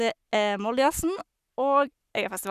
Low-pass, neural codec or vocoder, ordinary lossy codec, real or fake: 14.4 kHz; codec, 44.1 kHz, 7.8 kbps, Pupu-Codec; none; fake